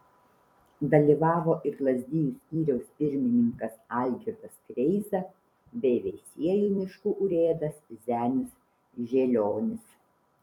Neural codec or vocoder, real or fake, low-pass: vocoder, 44.1 kHz, 128 mel bands every 256 samples, BigVGAN v2; fake; 19.8 kHz